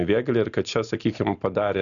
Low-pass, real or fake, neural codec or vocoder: 7.2 kHz; real; none